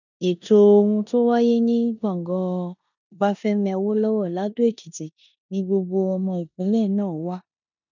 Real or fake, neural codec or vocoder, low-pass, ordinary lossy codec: fake; codec, 16 kHz in and 24 kHz out, 0.9 kbps, LongCat-Audio-Codec, four codebook decoder; 7.2 kHz; none